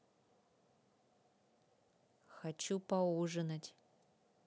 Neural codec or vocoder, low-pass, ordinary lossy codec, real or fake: none; none; none; real